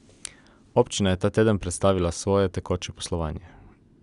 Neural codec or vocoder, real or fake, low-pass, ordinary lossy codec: none; real; 10.8 kHz; none